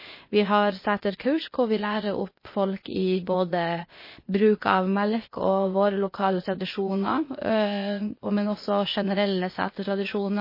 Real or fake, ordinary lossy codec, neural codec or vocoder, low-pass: fake; MP3, 24 kbps; codec, 16 kHz, 0.8 kbps, ZipCodec; 5.4 kHz